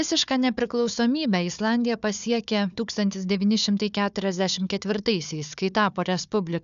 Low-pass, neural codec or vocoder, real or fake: 7.2 kHz; codec, 16 kHz, 4 kbps, FunCodec, trained on Chinese and English, 50 frames a second; fake